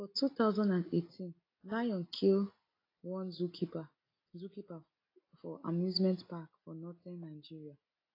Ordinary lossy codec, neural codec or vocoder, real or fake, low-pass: AAC, 24 kbps; none; real; 5.4 kHz